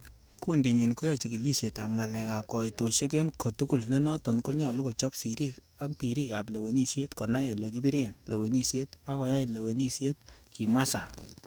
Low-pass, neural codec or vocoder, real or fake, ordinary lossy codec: none; codec, 44.1 kHz, 2.6 kbps, DAC; fake; none